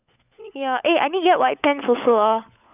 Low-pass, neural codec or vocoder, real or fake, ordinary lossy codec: 3.6 kHz; codec, 16 kHz, 16 kbps, FunCodec, trained on LibriTTS, 50 frames a second; fake; none